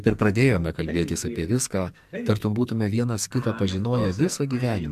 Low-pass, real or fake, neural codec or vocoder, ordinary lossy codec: 14.4 kHz; fake; codec, 44.1 kHz, 2.6 kbps, SNAC; MP3, 96 kbps